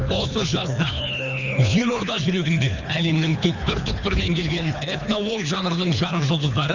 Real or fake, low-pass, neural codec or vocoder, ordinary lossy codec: fake; 7.2 kHz; codec, 24 kHz, 3 kbps, HILCodec; Opus, 64 kbps